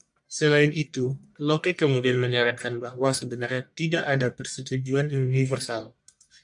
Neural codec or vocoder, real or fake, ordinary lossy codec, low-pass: codec, 44.1 kHz, 1.7 kbps, Pupu-Codec; fake; MP3, 64 kbps; 10.8 kHz